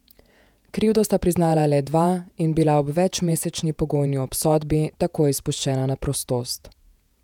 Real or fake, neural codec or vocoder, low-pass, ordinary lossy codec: fake; vocoder, 48 kHz, 128 mel bands, Vocos; 19.8 kHz; none